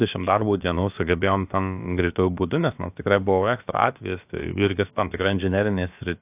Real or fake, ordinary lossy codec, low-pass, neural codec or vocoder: fake; AAC, 32 kbps; 3.6 kHz; codec, 16 kHz, about 1 kbps, DyCAST, with the encoder's durations